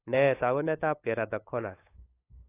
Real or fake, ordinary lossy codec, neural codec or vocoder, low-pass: fake; AAC, 24 kbps; codec, 16 kHz, 8 kbps, FunCodec, trained on LibriTTS, 25 frames a second; 3.6 kHz